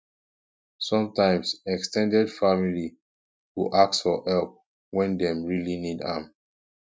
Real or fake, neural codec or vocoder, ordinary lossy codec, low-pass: real; none; none; none